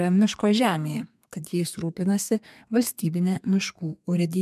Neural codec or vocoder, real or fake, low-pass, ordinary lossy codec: codec, 32 kHz, 1.9 kbps, SNAC; fake; 14.4 kHz; MP3, 96 kbps